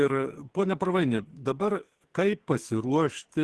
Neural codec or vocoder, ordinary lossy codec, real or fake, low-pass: codec, 44.1 kHz, 2.6 kbps, SNAC; Opus, 16 kbps; fake; 10.8 kHz